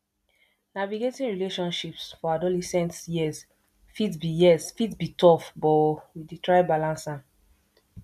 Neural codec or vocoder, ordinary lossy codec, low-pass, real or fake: none; none; 14.4 kHz; real